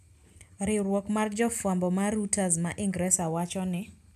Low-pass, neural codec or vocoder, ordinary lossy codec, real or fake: 14.4 kHz; none; MP3, 96 kbps; real